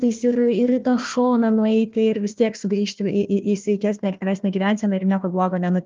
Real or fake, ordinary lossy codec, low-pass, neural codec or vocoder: fake; Opus, 32 kbps; 7.2 kHz; codec, 16 kHz, 1 kbps, FunCodec, trained on Chinese and English, 50 frames a second